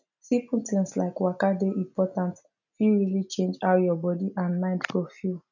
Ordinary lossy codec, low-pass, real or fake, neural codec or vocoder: none; 7.2 kHz; real; none